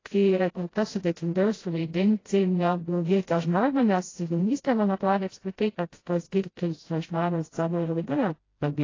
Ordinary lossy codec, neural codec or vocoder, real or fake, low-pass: AAC, 32 kbps; codec, 16 kHz, 0.5 kbps, FreqCodec, smaller model; fake; 7.2 kHz